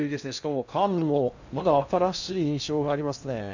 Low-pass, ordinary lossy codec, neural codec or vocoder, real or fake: 7.2 kHz; none; codec, 16 kHz in and 24 kHz out, 0.6 kbps, FocalCodec, streaming, 2048 codes; fake